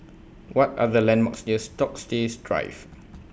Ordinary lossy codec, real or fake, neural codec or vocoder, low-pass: none; real; none; none